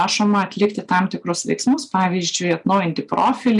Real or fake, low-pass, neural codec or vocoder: real; 10.8 kHz; none